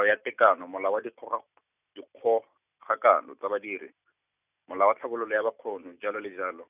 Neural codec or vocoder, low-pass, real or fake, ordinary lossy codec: none; 3.6 kHz; real; none